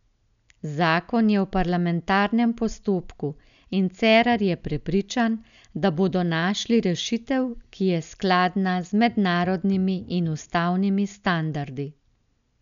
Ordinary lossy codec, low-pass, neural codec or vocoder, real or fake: none; 7.2 kHz; none; real